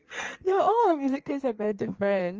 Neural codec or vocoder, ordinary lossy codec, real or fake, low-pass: codec, 16 kHz in and 24 kHz out, 1.1 kbps, FireRedTTS-2 codec; Opus, 24 kbps; fake; 7.2 kHz